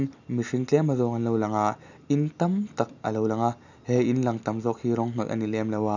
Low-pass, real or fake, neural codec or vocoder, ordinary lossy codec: 7.2 kHz; real; none; none